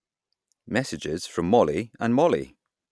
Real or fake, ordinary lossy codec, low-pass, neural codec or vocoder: real; none; none; none